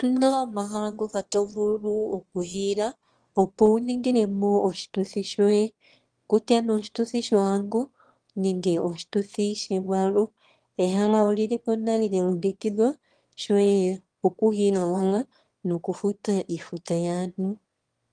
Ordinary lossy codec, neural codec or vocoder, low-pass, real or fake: Opus, 24 kbps; autoencoder, 22.05 kHz, a latent of 192 numbers a frame, VITS, trained on one speaker; 9.9 kHz; fake